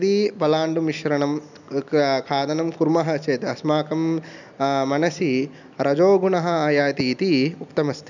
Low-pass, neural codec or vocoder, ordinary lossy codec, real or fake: 7.2 kHz; none; none; real